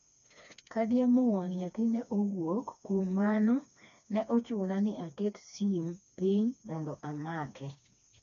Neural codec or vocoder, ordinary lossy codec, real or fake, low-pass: codec, 16 kHz, 2 kbps, FreqCodec, smaller model; none; fake; 7.2 kHz